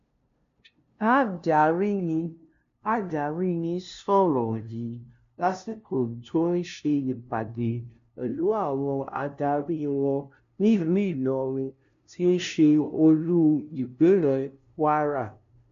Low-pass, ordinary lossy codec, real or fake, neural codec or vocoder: 7.2 kHz; MP3, 64 kbps; fake; codec, 16 kHz, 0.5 kbps, FunCodec, trained on LibriTTS, 25 frames a second